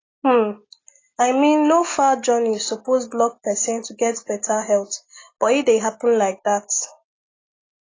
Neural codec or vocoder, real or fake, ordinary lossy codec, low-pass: none; real; AAC, 32 kbps; 7.2 kHz